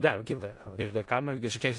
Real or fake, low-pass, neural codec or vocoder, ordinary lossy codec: fake; 10.8 kHz; codec, 16 kHz in and 24 kHz out, 0.4 kbps, LongCat-Audio-Codec, four codebook decoder; AAC, 48 kbps